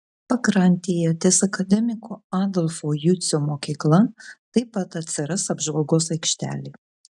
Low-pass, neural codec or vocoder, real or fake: 10.8 kHz; none; real